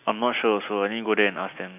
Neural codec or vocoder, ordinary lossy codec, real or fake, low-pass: none; none; real; 3.6 kHz